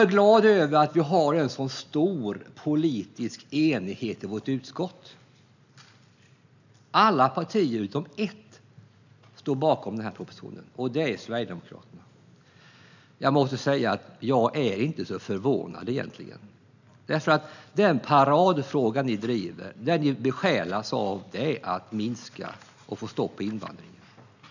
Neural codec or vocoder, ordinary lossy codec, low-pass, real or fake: none; none; 7.2 kHz; real